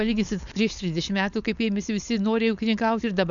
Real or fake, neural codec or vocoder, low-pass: fake; codec, 16 kHz, 4.8 kbps, FACodec; 7.2 kHz